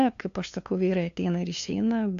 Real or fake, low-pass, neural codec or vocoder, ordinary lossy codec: fake; 7.2 kHz; codec, 16 kHz, 2 kbps, FunCodec, trained on LibriTTS, 25 frames a second; AAC, 64 kbps